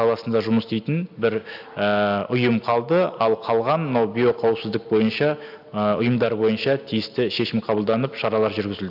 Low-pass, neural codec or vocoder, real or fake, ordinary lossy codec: 5.4 kHz; none; real; AAC, 48 kbps